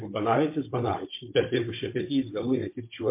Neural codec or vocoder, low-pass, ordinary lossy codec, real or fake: codec, 16 kHz, 16 kbps, FunCodec, trained on Chinese and English, 50 frames a second; 3.6 kHz; MP3, 24 kbps; fake